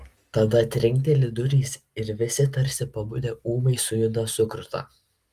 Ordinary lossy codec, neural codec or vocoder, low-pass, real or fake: Opus, 32 kbps; none; 14.4 kHz; real